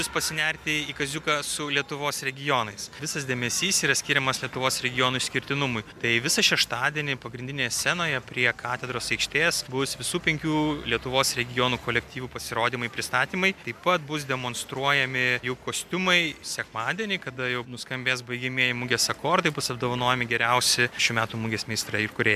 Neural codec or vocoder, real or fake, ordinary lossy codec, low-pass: none; real; MP3, 96 kbps; 14.4 kHz